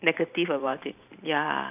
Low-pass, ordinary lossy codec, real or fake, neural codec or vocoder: 3.6 kHz; none; fake; vocoder, 44.1 kHz, 128 mel bands every 512 samples, BigVGAN v2